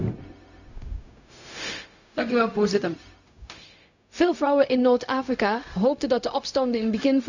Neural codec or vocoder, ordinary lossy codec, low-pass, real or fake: codec, 16 kHz, 0.4 kbps, LongCat-Audio-Codec; none; 7.2 kHz; fake